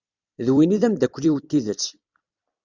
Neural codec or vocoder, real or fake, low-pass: vocoder, 44.1 kHz, 128 mel bands every 256 samples, BigVGAN v2; fake; 7.2 kHz